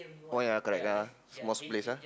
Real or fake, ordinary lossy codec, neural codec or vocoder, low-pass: real; none; none; none